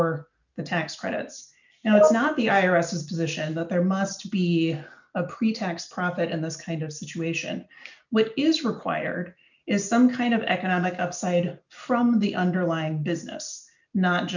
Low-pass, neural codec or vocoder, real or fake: 7.2 kHz; none; real